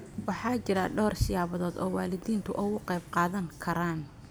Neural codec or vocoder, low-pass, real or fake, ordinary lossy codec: none; none; real; none